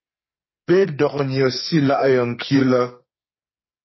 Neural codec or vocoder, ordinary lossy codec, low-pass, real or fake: codec, 44.1 kHz, 2.6 kbps, SNAC; MP3, 24 kbps; 7.2 kHz; fake